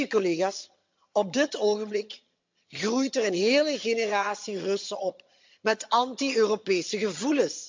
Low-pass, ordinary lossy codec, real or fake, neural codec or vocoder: 7.2 kHz; MP3, 64 kbps; fake; vocoder, 22.05 kHz, 80 mel bands, HiFi-GAN